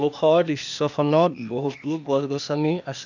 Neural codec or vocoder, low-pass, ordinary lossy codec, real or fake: codec, 16 kHz, 0.8 kbps, ZipCodec; 7.2 kHz; none; fake